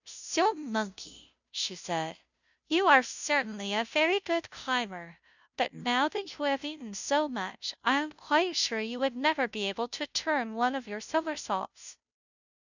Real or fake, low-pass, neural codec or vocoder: fake; 7.2 kHz; codec, 16 kHz, 0.5 kbps, FunCodec, trained on Chinese and English, 25 frames a second